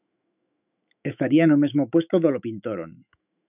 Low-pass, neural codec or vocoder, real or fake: 3.6 kHz; autoencoder, 48 kHz, 128 numbers a frame, DAC-VAE, trained on Japanese speech; fake